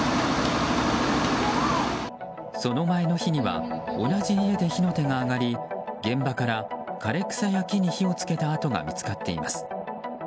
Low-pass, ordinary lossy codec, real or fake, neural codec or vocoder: none; none; real; none